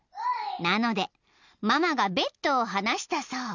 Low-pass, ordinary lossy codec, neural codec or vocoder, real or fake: 7.2 kHz; none; none; real